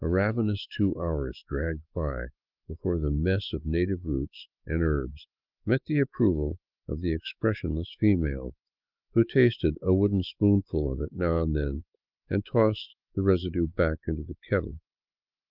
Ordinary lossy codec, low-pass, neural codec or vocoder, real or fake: Opus, 32 kbps; 5.4 kHz; autoencoder, 48 kHz, 128 numbers a frame, DAC-VAE, trained on Japanese speech; fake